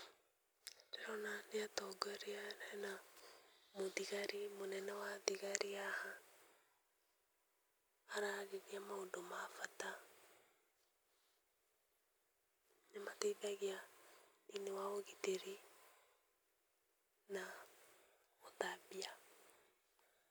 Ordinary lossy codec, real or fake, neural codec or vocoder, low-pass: none; real; none; none